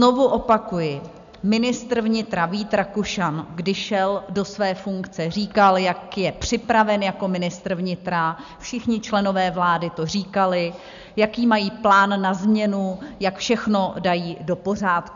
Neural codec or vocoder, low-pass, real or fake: none; 7.2 kHz; real